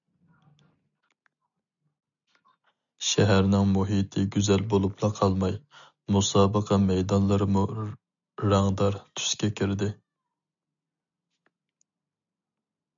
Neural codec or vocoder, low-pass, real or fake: none; 7.2 kHz; real